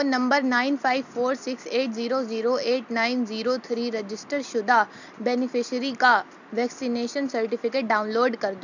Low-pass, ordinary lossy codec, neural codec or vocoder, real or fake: 7.2 kHz; none; none; real